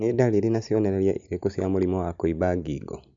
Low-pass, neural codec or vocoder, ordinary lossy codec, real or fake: 7.2 kHz; none; MP3, 64 kbps; real